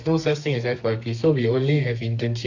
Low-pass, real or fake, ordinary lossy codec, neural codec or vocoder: 7.2 kHz; fake; AAC, 48 kbps; codec, 32 kHz, 1.9 kbps, SNAC